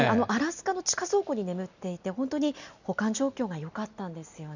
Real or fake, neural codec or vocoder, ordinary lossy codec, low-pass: real; none; none; 7.2 kHz